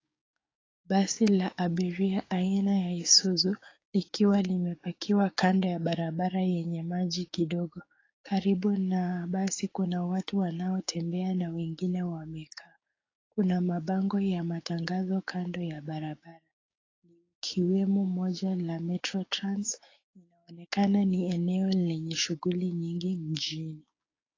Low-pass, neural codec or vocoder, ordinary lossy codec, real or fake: 7.2 kHz; codec, 16 kHz, 6 kbps, DAC; AAC, 32 kbps; fake